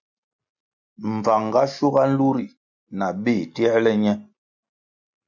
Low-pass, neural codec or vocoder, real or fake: 7.2 kHz; none; real